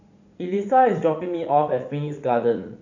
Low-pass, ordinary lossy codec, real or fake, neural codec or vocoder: 7.2 kHz; none; fake; vocoder, 44.1 kHz, 80 mel bands, Vocos